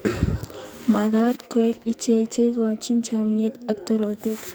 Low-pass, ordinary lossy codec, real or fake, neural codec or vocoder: none; none; fake; codec, 44.1 kHz, 2.6 kbps, SNAC